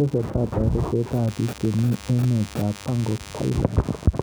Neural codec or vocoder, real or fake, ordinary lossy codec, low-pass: none; real; none; none